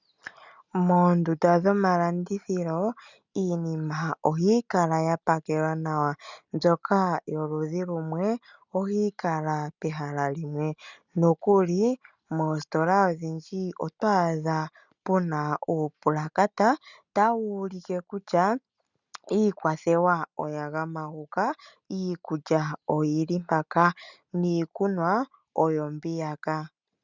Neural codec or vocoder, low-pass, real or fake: none; 7.2 kHz; real